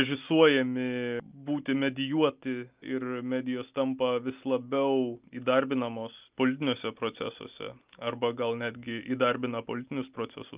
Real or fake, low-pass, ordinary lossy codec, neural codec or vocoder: real; 3.6 kHz; Opus, 24 kbps; none